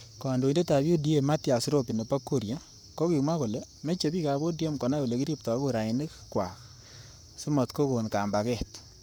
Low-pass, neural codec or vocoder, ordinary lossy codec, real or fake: none; codec, 44.1 kHz, 7.8 kbps, Pupu-Codec; none; fake